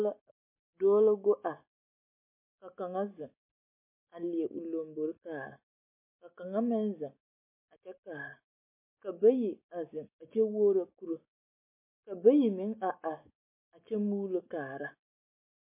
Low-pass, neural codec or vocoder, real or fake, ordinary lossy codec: 3.6 kHz; none; real; MP3, 24 kbps